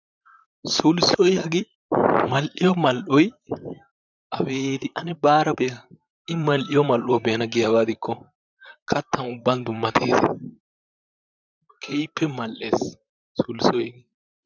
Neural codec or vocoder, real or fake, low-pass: vocoder, 44.1 kHz, 128 mel bands, Pupu-Vocoder; fake; 7.2 kHz